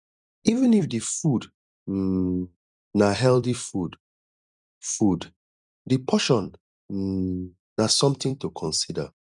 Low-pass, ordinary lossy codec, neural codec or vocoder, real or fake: 10.8 kHz; none; vocoder, 44.1 kHz, 128 mel bands every 256 samples, BigVGAN v2; fake